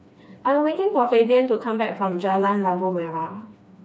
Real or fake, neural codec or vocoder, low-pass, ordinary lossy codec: fake; codec, 16 kHz, 2 kbps, FreqCodec, smaller model; none; none